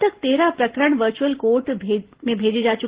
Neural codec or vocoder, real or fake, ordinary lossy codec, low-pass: none; real; Opus, 16 kbps; 3.6 kHz